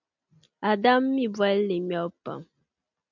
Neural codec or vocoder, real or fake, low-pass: none; real; 7.2 kHz